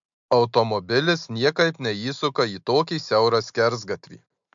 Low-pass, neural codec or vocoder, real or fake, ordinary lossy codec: 7.2 kHz; none; real; MP3, 64 kbps